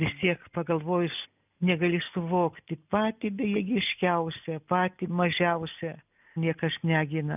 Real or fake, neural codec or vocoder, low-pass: real; none; 3.6 kHz